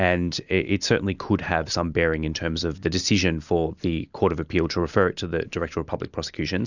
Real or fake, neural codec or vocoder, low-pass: real; none; 7.2 kHz